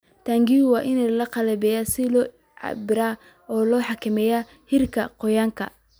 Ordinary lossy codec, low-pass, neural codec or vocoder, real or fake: none; none; none; real